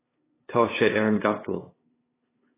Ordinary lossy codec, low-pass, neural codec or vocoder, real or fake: AAC, 16 kbps; 3.6 kHz; codec, 16 kHz, 2 kbps, FunCodec, trained on LibriTTS, 25 frames a second; fake